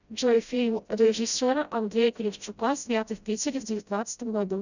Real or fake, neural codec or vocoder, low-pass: fake; codec, 16 kHz, 0.5 kbps, FreqCodec, smaller model; 7.2 kHz